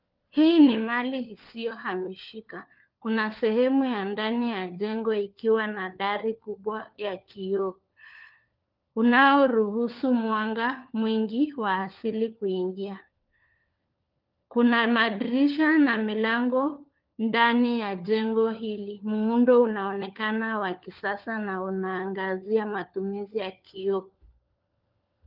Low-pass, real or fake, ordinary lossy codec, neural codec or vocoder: 5.4 kHz; fake; Opus, 32 kbps; codec, 16 kHz, 4 kbps, FunCodec, trained on LibriTTS, 50 frames a second